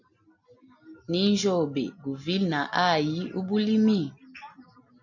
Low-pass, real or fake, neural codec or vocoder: 7.2 kHz; real; none